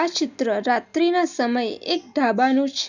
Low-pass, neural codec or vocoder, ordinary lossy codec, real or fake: 7.2 kHz; none; none; real